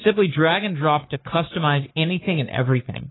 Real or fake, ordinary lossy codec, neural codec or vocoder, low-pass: fake; AAC, 16 kbps; codec, 44.1 kHz, 3.4 kbps, Pupu-Codec; 7.2 kHz